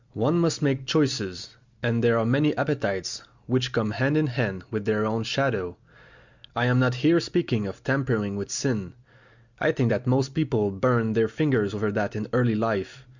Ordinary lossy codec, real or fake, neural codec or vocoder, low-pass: Opus, 64 kbps; real; none; 7.2 kHz